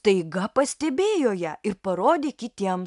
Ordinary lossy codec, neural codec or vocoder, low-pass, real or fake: AAC, 96 kbps; none; 10.8 kHz; real